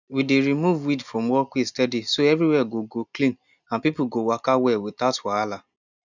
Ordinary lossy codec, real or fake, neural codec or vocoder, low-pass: none; real; none; 7.2 kHz